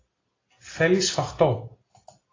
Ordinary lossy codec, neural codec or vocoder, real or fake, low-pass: AAC, 32 kbps; none; real; 7.2 kHz